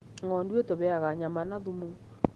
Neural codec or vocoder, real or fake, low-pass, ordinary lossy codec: none; real; 10.8 kHz; Opus, 16 kbps